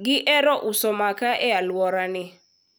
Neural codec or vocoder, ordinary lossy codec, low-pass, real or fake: none; none; none; real